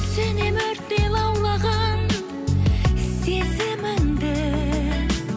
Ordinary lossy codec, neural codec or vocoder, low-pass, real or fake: none; none; none; real